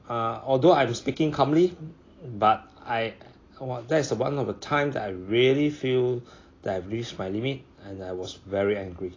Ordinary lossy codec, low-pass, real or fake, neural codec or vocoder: AAC, 32 kbps; 7.2 kHz; real; none